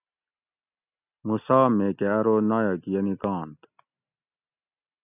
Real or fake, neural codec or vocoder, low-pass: real; none; 3.6 kHz